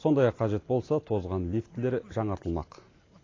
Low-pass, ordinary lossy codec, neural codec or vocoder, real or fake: 7.2 kHz; AAC, 32 kbps; none; real